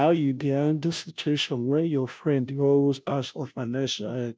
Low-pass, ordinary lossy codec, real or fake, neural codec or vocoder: none; none; fake; codec, 16 kHz, 0.5 kbps, FunCodec, trained on Chinese and English, 25 frames a second